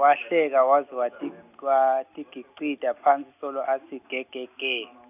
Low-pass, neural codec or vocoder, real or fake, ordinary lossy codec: 3.6 kHz; none; real; none